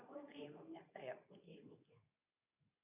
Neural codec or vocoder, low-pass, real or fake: codec, 24 kHz, 0.9 kbps, WavTokenizer, medium speech release version 2; 3.6 kHz; fake